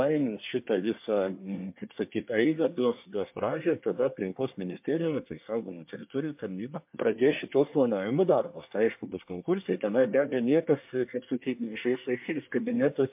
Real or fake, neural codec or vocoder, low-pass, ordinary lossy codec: fake; codec, 24 kHz, 1 kbps, SNAC; 3.6 kHz; MP3, 32 kbps